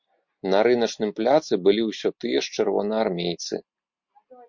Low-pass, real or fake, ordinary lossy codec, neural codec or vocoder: 7.2 kHz; real; MP3, 48 kbps; none